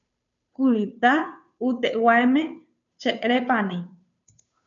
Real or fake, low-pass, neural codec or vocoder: fake; 7.2 kHz; codec, 16 kHz, 2 kbps, FunCodec, trained on Chinese and English, 25 frames a second